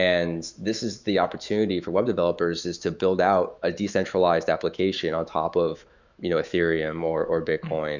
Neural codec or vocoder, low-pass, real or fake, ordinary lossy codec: autoencoder, 48 kHz, 128 numbers a frame, DAC-VAE, trained on Japanese speech; 7.2 kHz; fake; Opus, 64 kbps